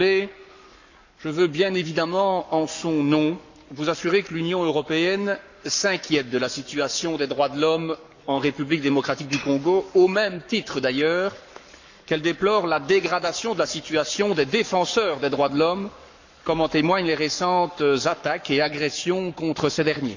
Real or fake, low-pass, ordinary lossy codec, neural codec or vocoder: fake; 7.2 kHz; none; codec, 44.1 kHz, 7.8 kbps, Pupu-Codec